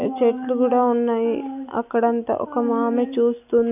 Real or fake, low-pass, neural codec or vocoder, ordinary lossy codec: real; 3.6 kHz; none; none